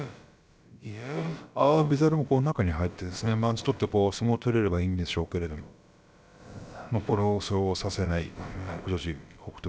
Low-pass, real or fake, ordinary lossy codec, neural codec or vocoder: none; fake; none; codec, 16 kHz, about 1 kbps, DyCAST, with the encoder's durations